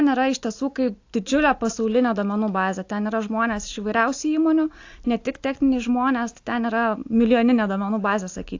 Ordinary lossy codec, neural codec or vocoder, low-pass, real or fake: AAC, 48 kbps; none; 7.2 kHz; real